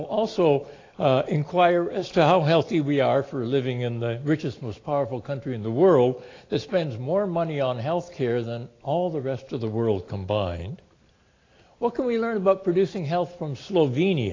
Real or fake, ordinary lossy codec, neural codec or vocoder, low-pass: real; AAC, 32 kbps; none; 7.2 kHz